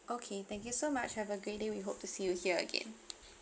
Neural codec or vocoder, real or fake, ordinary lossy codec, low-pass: none; real; none; none